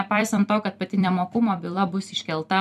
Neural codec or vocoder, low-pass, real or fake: vocoder, 44.1 kHz, 128 mel bands every 256 samples, BigVGAN v2; 14.4 kHz; fake